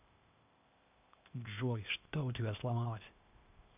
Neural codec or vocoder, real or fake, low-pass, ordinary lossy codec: codec, 16 kHz, 0.8 kbps, ZipCodec; fake; 3.6 kHz; none